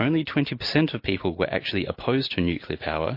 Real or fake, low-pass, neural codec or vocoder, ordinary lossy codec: real; 5.4 kHz; none; MP3, 32 kbps